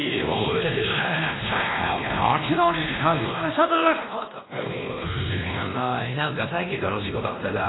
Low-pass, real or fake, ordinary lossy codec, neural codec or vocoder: 7.2 kHz; fake; AAC, 16 kbps; codec, 16 kHz, 1 kbps, X-Codec, WavLM features, trained on Multilingual LibriSpeech